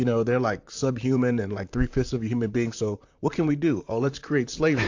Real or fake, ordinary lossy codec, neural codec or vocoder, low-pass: fake; AAC, 48 kbps; vocoder, 44.1 kHz, 128 mel bands, Pupu-Vocoder; 7.2 kHz